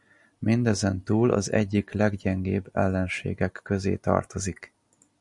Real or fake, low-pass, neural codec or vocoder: real; 10.8 kHz; none